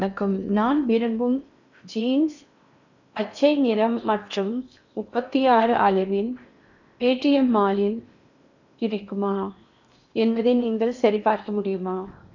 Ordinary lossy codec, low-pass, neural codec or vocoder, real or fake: none; 7.2 kHz; codec, 16 kHz in and 24 kHz out, 0.8 kbps, FocalCodec, streaming, 65536 codes; fake